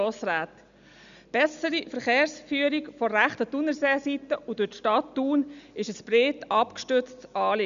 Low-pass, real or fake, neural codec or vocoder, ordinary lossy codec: 7.2 kHz; real; none; none